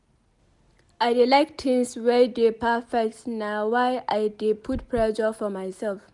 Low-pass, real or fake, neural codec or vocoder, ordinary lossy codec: 10.8 kHz; real; none; none